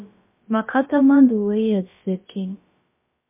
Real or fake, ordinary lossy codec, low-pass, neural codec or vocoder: fake; MP3, 24 kbps; 3.6 kHz; codec, 16 kHz, about 1 kbps, DyCAST, with the encoder's durations